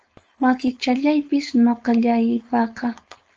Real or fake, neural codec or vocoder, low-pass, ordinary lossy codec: fake; codec, 16 kHz, 4.8 kbps, FACodec; 7.2 kHz; Opus, 24 kbps